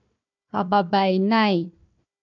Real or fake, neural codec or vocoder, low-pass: fake; codec, 16 kHz, 1 kbps, FunCodec, trained on Chinese and English, 50 frames a second; 7.2 kHz